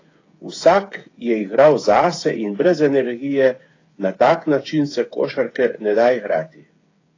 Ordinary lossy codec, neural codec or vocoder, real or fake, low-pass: AAC, 32 kbps; codec, 16 kHz, 8 kbps, FreqCodec, smaller model; fake; 7.2 kHz